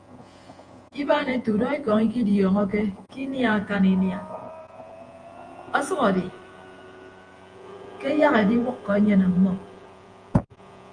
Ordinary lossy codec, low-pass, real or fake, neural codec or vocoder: Opus, 32 kbps; 9.9 kHz; fake; vocoder, 48 kHz, 128 mel bands, Vocos